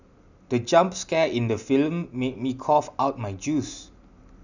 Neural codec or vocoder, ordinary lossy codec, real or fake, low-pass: none; none; real; 7.2 kHz